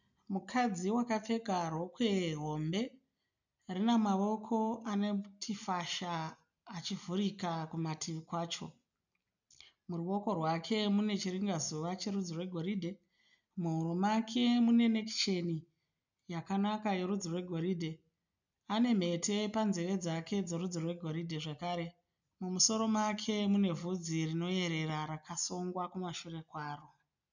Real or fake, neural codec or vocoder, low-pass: real; none; 7.2 kHz